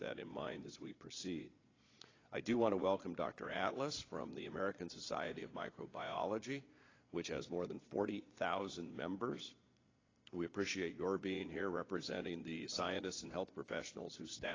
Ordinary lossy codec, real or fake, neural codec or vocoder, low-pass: AAC, 32 kbps; fake; vocoder, 22.05 kHz, 80 mel bands, Vocos; 7.2 kHz